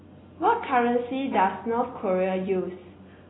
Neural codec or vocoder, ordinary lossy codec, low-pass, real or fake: none; AAC, 16 kbps; 7.2 kHz; real